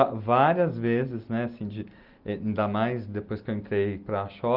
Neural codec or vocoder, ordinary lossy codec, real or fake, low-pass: none; Opus, 32 kbps; real; 5.4 kHz